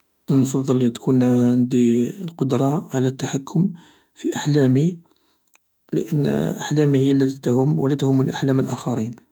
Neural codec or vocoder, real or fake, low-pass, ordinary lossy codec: autoencoder, 48 kHz, 32 numbers a frame, DAC-VAE, trained on Japanese speech; fake; 19.8 kHz; none